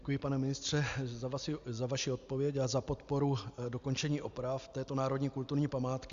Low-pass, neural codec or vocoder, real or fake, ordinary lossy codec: 7.2 kHz; none; real; Opus, 64 kbps